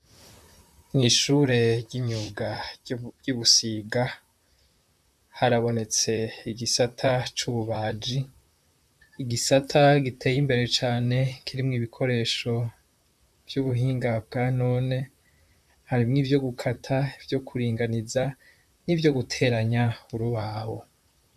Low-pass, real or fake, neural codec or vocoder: 14.4 kHz; fake; vocoder, 44.1 kHz, 128 mel bands, Pupu-Vocoder